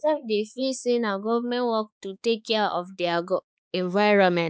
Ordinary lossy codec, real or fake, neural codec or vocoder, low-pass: none; fake; codec, 16 kHz, 4 kbps, X-Codec, HuBERT features, trained on balanced general audio; none